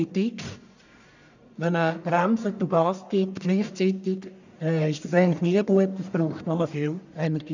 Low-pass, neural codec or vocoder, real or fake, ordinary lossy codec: 7.2 kHz; codec, 44.1 kHz, 1.7 kbps, Pupu-Codec; fake; none